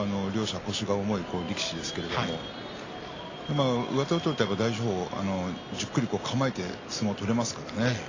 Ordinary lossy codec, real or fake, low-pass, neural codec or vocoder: AAC, 32 kbps; real; 7.2 kHz; none